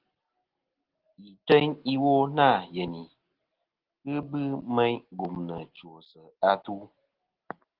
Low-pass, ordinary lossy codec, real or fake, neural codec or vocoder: 5.4 kHz; Opus, 16 kbps; real; none